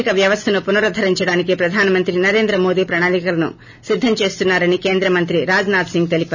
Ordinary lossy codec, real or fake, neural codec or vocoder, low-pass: none; real; none; 7.2 kHz